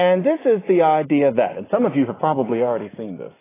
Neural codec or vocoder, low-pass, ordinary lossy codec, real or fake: none; 3.6 kHz; AAC, 16 kbps; real